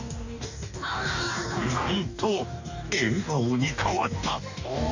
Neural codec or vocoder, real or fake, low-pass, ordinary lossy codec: codec, 44.1 kHz, 2.6 kbps, DAC; fake; 7.2 kHz; none